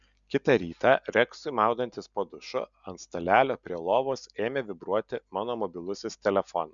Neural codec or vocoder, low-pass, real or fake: none; 7.2 kHz; real